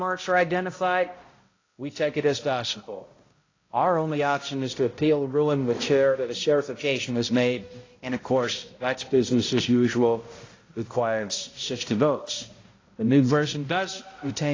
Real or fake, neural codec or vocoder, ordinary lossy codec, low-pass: fake; codec, 16 kHz, 0.5 kbps, X-Codec, HuBERT features, trained on balanced general audio; AAC, 32 kbps; 7.2 kHz